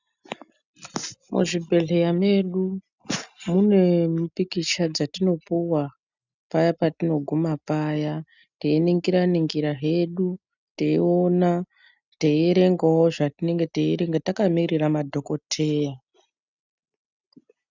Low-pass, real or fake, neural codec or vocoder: 7.2 kHz; real; none